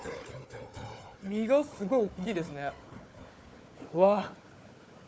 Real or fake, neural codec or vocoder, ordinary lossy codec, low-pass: fake; codec, 16 kHz, 4 kbps, FunCodec, trained on Chinese and English, 50 frames a second; none; none